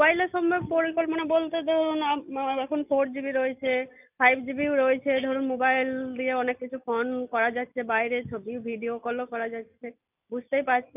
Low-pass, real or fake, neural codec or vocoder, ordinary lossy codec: 3.6 kHz; real; none; none